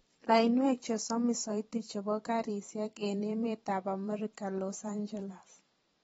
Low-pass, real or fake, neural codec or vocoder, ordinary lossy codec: 19.8 kHz; fake; vocoder, 44.1 kHz, 128 mel bands every 512 samples, BigVGAN v2; AAC, 24 kbps